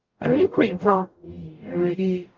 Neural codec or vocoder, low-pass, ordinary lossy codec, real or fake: codec, 44.1 kHz, 0.9 kbps, DAC; 7.2 kHz; Opus, 32 kbps; fake